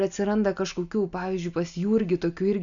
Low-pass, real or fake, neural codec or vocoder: 7.2 kHz; real; none